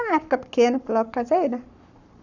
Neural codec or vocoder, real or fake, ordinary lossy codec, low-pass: codec, 44.1 kHz, 7.8 kbps, Pupu-Codec; fake; none; 7.2 kHz